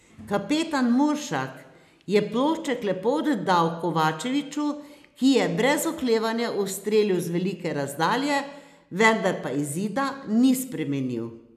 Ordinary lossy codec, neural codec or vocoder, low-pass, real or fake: none; none; 14.4 kHz; real